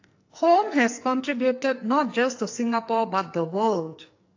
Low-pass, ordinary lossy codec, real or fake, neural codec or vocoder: 7.2 kHz; AAC, 48 kbps; fake; codec, 44.1 kHz, 2.6 kbps, SNAC